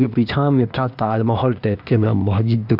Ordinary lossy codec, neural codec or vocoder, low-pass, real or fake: none; codec, 16 kHz, 0.8 kbps, ZipCodec; 5.4 kHz; fake